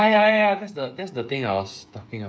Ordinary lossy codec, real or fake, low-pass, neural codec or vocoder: none; fake; none; codec, 16 kHz, 8 kbps, FreqCodec, smaller model